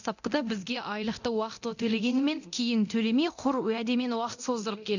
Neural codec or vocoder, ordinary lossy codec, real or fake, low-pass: codec, 24 kHz, 0.9 kbps, DualCodec; none; fake; 7.2 kHz